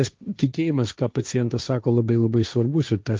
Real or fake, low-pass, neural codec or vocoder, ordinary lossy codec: fake; 7.2 kHz; codec, 16 kHz, 1.1 kbps, Voila-Tokenizer; Opus, 24 kbps